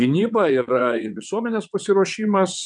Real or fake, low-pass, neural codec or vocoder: fake; 9.9 kHz; vocoder, 22.05 kHz, 80 mel bands, WaveNeXt